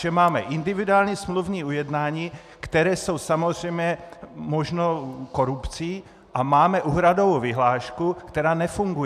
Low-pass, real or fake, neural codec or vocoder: 14.4 kHz; real; none